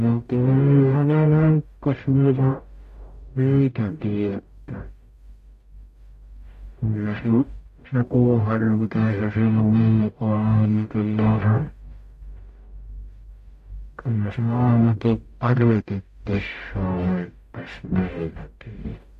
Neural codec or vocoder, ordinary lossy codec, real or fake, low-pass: codec, 44.1 kHz, 0.9 kbps, DAC; AAC, 64 kbps; fake; 14.4 kHz